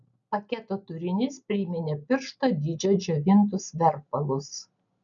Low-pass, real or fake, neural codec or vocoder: 7.2 kHz; real; none